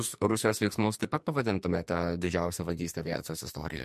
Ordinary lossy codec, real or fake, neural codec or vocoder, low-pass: MP3, 64 kbps; fake; codec, 44.1 kHz, 2.6 kbps, SNAC; 14.4 kHz